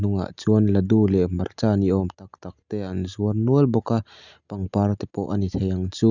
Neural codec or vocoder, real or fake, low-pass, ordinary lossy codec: none; real; 7.2 kHz; none